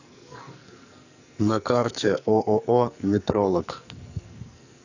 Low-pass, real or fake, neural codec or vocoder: 7.2 kHz; fake; codec, 44.1 kHz, 2.6 kbps, SNAC